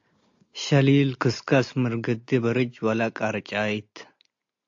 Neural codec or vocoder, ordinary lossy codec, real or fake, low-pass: none; AAC, 48 kbps; real; 7.2 kHz